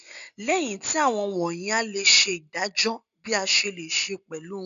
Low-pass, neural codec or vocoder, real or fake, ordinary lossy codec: 7.2 kHz; none; real; none